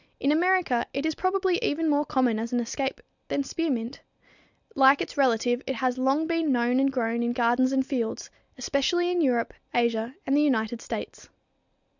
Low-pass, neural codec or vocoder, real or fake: 7.2 kHz; none; real